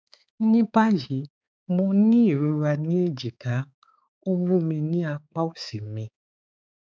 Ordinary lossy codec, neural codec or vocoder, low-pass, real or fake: none; codec, 16 kHz, 4 kbps, X-Codec, HuBERT features, trained on balanced general audio; none; fake